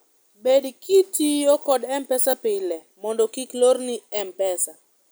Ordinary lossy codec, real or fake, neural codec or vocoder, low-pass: none; real; none; none